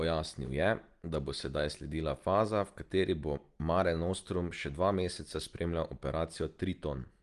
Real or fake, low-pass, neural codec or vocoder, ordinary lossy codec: real; 10.8 kHz; none; Opus, 24 kbps